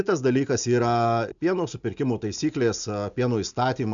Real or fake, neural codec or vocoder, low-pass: real; none; 7.2 kHz